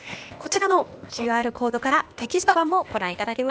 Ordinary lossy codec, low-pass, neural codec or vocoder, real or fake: none; none; codec, 16 kHz, 0.8 kbps, ZipCodec; fake